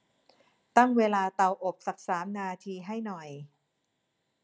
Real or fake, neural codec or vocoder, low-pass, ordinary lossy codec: real; none; none; none